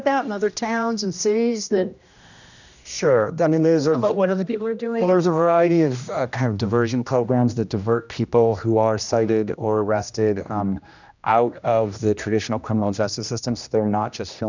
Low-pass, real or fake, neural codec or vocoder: 7.2 kHz; fake; codec, 16 kHz, 1 kbps, X-Codec, HuBERT features, trained on general audio